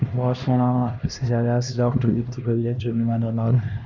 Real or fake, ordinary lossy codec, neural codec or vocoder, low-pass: fake; Opus, 64 kbps; codec, 16 kHz, 1 kbps, X-Codec, HuBERT features, trained on LibriSpeech; 7.2 kHz